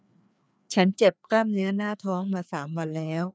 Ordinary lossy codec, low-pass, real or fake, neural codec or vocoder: none; none; fake; codec, 16 kHz, 2 kbps, FreqCodec, larger model